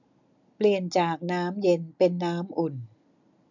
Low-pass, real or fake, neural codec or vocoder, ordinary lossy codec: 7.2 kHz; real; none; none